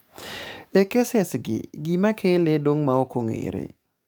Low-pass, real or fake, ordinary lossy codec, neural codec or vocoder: none; fake; none; codec, 44.1 kHz, 7.8 kbps, DAC